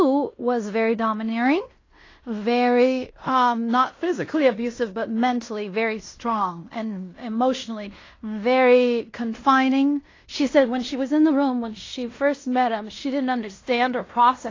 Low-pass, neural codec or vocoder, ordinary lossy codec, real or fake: 7.2 kHz; codec, 16 kHz in and 24 kHz out, 0.9 kbps, LongCat-Audio-Codec, fine tuned four codebook decoder; AAC, 32 kbps; fake